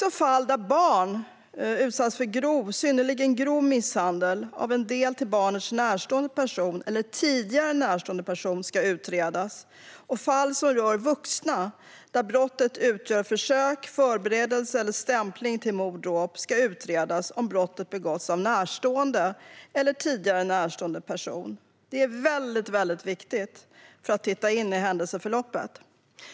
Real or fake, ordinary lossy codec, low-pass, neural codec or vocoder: real; none; none; none